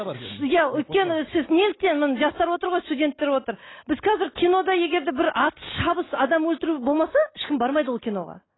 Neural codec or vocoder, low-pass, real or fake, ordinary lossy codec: none; 7.2 kHz; real; AAC, 16 kbps